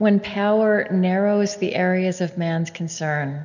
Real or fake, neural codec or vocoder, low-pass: real; none; 7.2 kHz